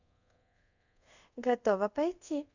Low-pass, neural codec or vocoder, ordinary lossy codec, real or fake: 7.2 kHz; codec, 24 kHz, 0.5 kbps, DualCodec; none; fake